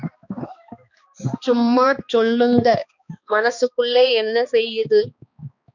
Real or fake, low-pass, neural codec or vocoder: fake; 7.2 kHz; codec, 16 kHz, 2 kbps, X-Codec, HuBERT features, trained on balanced general audio